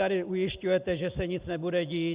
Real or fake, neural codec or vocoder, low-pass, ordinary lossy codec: real; none; 3.6 kHz; Opus, 64 kbps